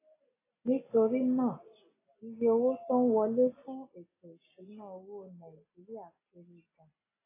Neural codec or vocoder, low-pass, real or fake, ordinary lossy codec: none; 3.6 kHz; real; AAC, 16 kbps